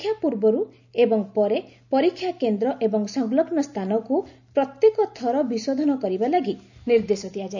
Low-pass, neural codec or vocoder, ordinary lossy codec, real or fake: 7.2 kHz; none; none; real